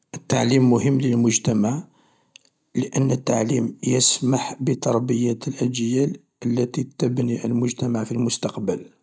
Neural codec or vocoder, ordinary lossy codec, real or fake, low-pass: none; none; real; none